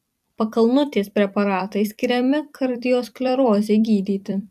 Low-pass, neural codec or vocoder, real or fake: 14.4 kHz; vocoder, 44.1 kHz, 128 mel bands every 256 samples, BigVGAN v2; fake